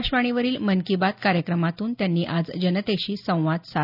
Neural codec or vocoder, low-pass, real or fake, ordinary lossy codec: none; 5.4 kHz; real; none